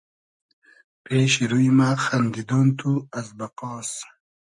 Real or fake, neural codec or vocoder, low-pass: real; none; 10.8 kHz